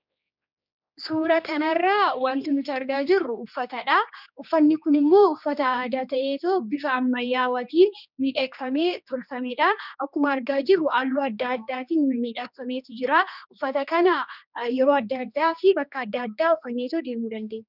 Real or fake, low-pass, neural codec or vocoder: fake; 5.4 kHz; codec, 16 kHz, 2 kbps, X-Codec, HuBERT features, trained on general audio